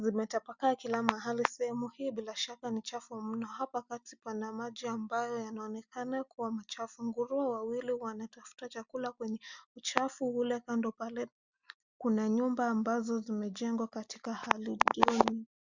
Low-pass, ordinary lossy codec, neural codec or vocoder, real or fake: 7.2 kHz; Opus, 64 kbps; none; real